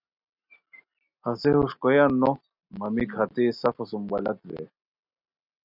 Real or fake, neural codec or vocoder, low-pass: real; none; 5.4 kHz